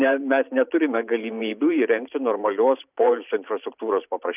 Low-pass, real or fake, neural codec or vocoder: 3.6 kHz; fake; vocoder, 44.1 kHz, 128 mel bands every 512 samples, BigVGAN v2